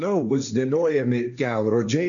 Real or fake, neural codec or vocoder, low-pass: fake; codec, 16 kHz, 1.1 kbps, Voila-Tokenizer; 7.2 kHz